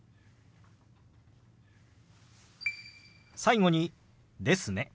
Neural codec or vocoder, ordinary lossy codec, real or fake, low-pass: none; none; real; none